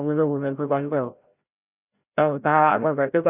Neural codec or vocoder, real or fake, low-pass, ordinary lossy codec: codec, 16 kHz, 0.5 kbps, FreqCodec, larger model; fake; 3.6 kHz; none